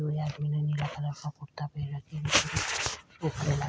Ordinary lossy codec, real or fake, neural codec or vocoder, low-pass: none; real; none; none